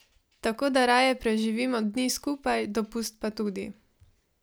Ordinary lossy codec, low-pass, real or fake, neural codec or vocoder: none; none; real; none